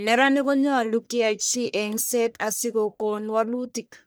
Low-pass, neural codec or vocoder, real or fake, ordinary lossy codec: none; codec, 44.1 kHz, 1.7 kbps, Pupu-Codec; fake; none